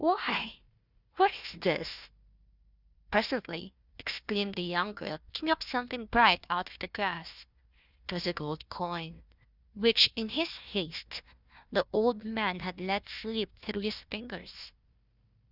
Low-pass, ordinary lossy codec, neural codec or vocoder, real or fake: 5.4 kHz; Opus, 64 kbps; codec, 16 kHz, 1 kbps, FunCodec, trained on Chinese and English, 50 frames a second; fake